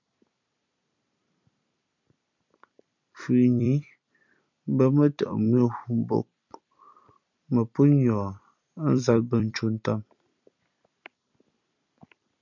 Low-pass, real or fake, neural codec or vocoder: 7.2 kHz; fake; vocoder, 44.1 kHz, 128 mel bands every 256 samples, BigVGAN v2